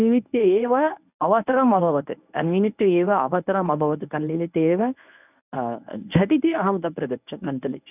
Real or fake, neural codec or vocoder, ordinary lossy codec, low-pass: fake; codec, 24 kHz, 0.9 kbps, WavTokenizer, medium speech release version 1; none; 3.6 kHz